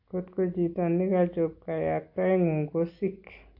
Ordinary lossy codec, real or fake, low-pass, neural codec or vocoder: AAC, 48 kbps; real; 5.4 kHz; none